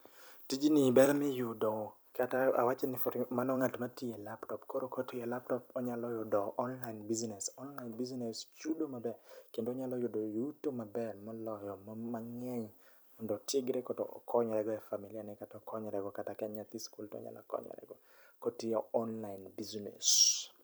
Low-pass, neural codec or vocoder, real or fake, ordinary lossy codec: none; vocoder, 44.1 kHz, 128 mel bands every 256 samples, BigVGAN v2; fake; none